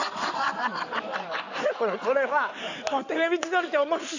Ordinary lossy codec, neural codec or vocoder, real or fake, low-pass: none; codec, 16 kHz in and 24 kHz out, 2.2 kbps, FireRedTTS-2 codec; fake; 7.2 kHz